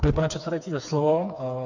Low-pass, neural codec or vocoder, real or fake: 7.2 kHz; codec, 16 kHz, 4 kbps, FreqCodec, smaller model; fake